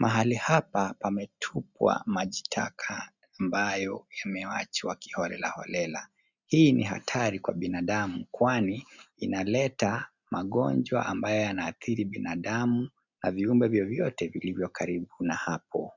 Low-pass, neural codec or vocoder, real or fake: 7.2 kHz; none; real